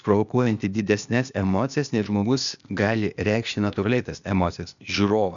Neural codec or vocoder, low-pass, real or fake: codec, 16 kHz, 0.8 kbps, ZipCodec; 7.2 kHz; fake